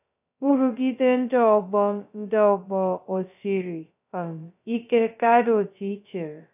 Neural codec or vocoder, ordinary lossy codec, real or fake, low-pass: codec, 16 kHz, 0.2 kbps, FocalCodec; none; fake; 3.6 kHz